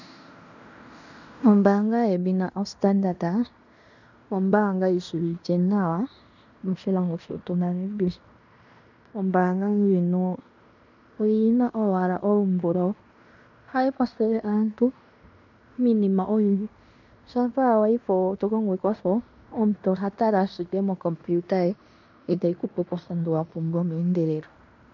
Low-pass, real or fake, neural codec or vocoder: 7.2 kHz; fake; codec, 16 kHz in and 24 kHz out, 0.9 kbps, LongCat-Audio-Codec, fine tuned four codebook decoder